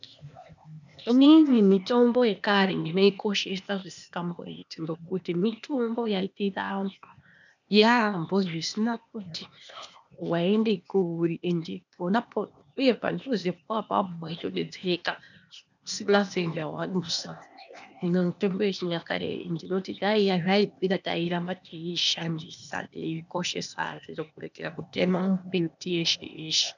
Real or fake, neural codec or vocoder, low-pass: fake; codec, 16 kHz, 0.8 kbps, ZipCodec; 7.2 kHz